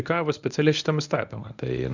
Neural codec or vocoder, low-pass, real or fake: codec, 24 kHz, 0.9 kbps, WavTokenizer, medium speech release version 1; 7.2 kHz; fake